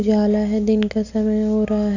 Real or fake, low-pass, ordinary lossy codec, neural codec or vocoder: real; 7.2 kHz; none; none